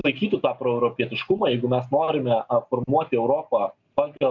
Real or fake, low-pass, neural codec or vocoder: real; 7.2 kHz; none